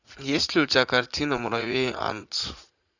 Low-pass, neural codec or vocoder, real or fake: 7.2 kHz; vocoder, 44.1 kHz, 80 mel bands, Vocos; fake